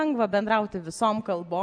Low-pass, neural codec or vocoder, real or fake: 9.9 kHz; none; real